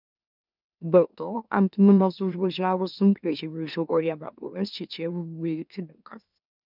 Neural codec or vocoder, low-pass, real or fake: autoencoder, 44.1 kHz, a latent of 192 numbers a frame, MeloTTS; 5.4 kHz; fake